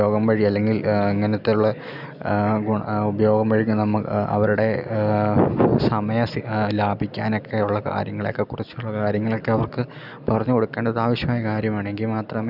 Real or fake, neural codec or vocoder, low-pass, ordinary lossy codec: real; none; 5.4 kHz; none